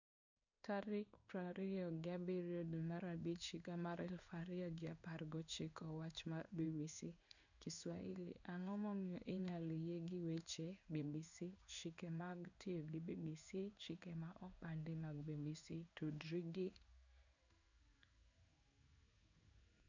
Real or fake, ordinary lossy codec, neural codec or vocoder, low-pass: fake; none; codec, 16 kHz in and 24 kHz out, 1 kbps, XY-Tokenizer; 7.2 kHz